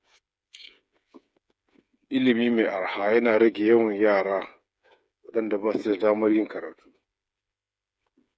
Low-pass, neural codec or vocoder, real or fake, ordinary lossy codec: none; codec, 16 kHz, 8 kbps, FreqCodec, smaller model; fake; none